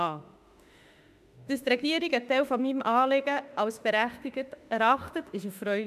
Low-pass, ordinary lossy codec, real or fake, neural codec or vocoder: 14.4 kHz; none; fake; autoencoder, 48 kHz, 32 numbers a frame, DAC-VAE, trained on Japanese speech